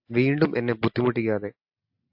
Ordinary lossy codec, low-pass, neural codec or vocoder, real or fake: AAC, 48 kbps; 5.4 kHz; none; real